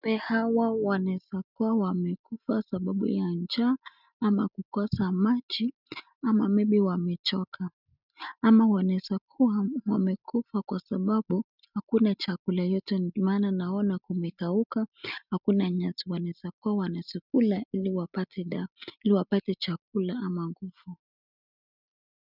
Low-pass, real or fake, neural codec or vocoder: 5.4 kHz; real; none